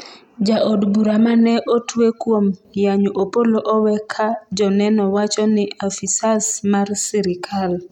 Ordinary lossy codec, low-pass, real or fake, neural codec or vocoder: none; 19.8 kHz; real; none